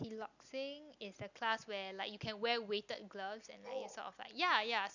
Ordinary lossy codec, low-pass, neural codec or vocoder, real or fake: none; 7.2 kHz; none; real